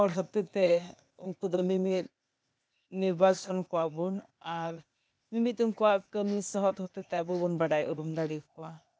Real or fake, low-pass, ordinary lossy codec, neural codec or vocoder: fake; none; none; codec, 16 kHz, 0.8 kbps, ZipCodec